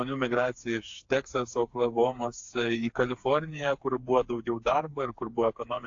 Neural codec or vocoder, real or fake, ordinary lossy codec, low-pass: codec, 16 kHz, 4 kbps, FreqCodec, smaller model; fake; AAC, 48 kbps; 7.2 kHz